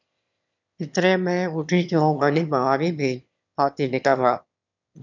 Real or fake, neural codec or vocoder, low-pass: fake; autoencoder, 22.05 kHz, a latent of 192 numbers a frame, VITS, trained on one speaker; 7.2 kHz